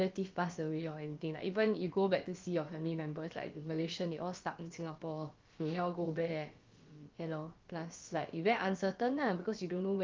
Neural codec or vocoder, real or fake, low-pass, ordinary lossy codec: codec, 16 kHz, about 1 kbps, DyCAST, with the encoder's durations; fake; 7.2 kHz; Opus, 32 kbps